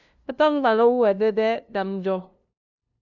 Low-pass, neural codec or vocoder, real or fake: 7.2 kHz; codec, 16 kHz, 0.5 kbps, FunCodec, trained on LibriTTS, 25 frames a second; fake